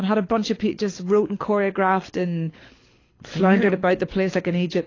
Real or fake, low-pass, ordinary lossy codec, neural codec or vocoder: fake; 7.2 kHz; AAC, 32 kbps; codec, 24 kHz, 6 kbps, HILCodec